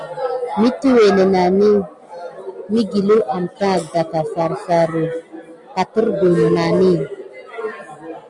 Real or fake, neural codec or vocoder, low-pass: real; none; 10.8 kHz